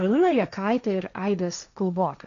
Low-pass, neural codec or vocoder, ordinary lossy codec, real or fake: 7.2 kHz; codec, 16 kHz, 1.1 kbps, Voila-Tokenizer; AAC, 96 kbps; fake